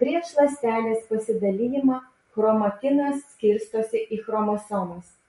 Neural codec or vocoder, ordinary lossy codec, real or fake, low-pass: none; MP3, 48 kbps; real; 14.4 kHz